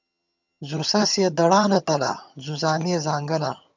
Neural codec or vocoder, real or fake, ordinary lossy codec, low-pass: vocoder, 22.05 kHz, 80 mel bands, HiFi-GAN; fake; MP3, 64 kbps; 7.2 kHz